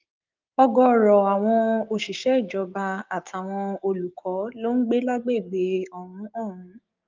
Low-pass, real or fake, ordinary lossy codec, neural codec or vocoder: 7.2 kHz; fake; Opus, 24 kbps; codec, 16 kHz, 6 kbps, DAC